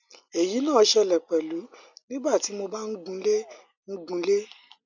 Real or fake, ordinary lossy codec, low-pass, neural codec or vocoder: real; none; 7.2 kHz; none